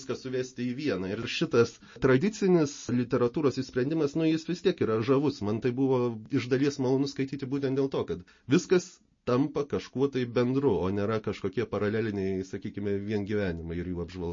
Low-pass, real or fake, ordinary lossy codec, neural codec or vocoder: 7.2 kHz; real; MP3, 32 kbps; none